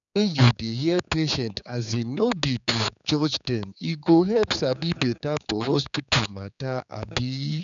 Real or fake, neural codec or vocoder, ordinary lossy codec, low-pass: fake; codec, 16 kHz, 4 kbps, X-Codec, HuBERT features, trained on general audio; none; 7.2 kHz